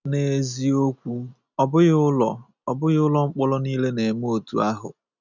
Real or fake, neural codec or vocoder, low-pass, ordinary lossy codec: real; none; 7.2 kHz; none